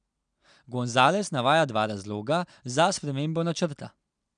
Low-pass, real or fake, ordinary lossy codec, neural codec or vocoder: 9.9 kHz; real; none; none